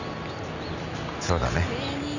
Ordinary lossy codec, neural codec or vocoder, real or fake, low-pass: none; none; real; 7.2 kHz